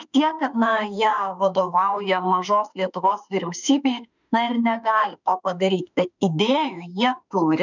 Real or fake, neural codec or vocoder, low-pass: fake; autoencoder, 48 kHz, 32 numbers a frame, DAC-VAE, trained on Japanese speech; 7.2 kHz